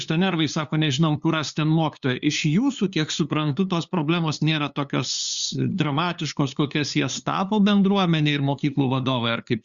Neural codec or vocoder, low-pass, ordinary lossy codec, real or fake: codec, 16 kHz, 2 kbps, FunCodec, trained on LibriTTS, 25 frames a second; 7.2 kHz; Opus, 64 kbps; fake